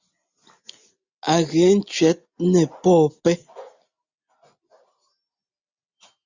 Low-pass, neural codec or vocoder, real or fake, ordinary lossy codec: 7.2 kHz; none; real; Opus, 64 kbps